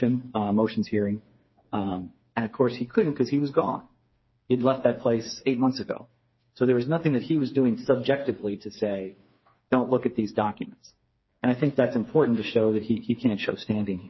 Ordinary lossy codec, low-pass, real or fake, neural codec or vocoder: MP3, 24 kbps; 7.2 kHz; fake; codec, 16 kHz, 4 kbps, FreqCodec, smaller model